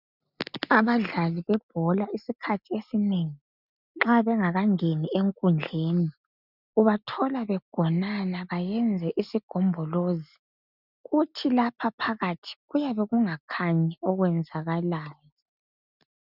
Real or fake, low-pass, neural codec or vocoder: real; 5.4 kHz; none